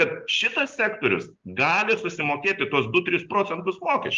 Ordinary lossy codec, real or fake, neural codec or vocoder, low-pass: Opus, 32 kbps; real; none; 7.2 kHz